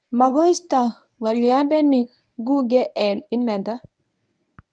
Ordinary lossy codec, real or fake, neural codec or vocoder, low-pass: none; fake; codec, 24 kHz, 0.9 kbps, WavTokenizer, medium speech release version 1; 9.9 kHz